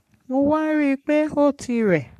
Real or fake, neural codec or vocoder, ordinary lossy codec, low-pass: fake; codec, 44.1 kHz, 3.4 kbps, Pupu-Codec; none; 14.4 kHz